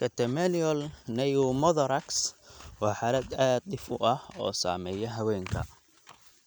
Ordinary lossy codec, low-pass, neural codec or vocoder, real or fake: none; none; none; real